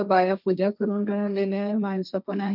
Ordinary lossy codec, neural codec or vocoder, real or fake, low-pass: none; codec, 16 kHz, 1.1 kbps, Voila-Tokenizer; fake; 5.4 kHz